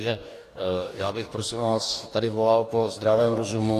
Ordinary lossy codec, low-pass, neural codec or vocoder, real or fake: AAC, 64 kbps; 14.4 kHz; codec, 44.1 kHz, 2.6 kbps, DAC; fake